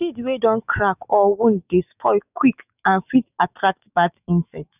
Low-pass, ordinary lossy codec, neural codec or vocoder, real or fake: 3.6 kHz; none; autoencoder, 48 kHz, 128 numbers a frame, DAC-VAE, trained on Japanese speech; fake